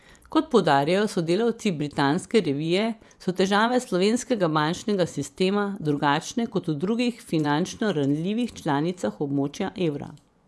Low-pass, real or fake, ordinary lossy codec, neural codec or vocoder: none; fake; none; vocoder, 24 kHz, 100 mel bands, Vocos